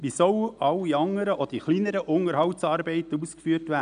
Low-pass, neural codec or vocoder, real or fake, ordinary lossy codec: 9.9 kHz; none; real; none